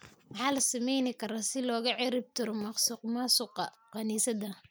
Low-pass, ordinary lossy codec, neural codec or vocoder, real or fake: none; none; none; real